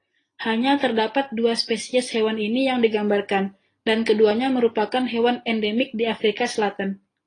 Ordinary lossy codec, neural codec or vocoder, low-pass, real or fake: AAC, 32 kbps; none; 9.9 kHz; real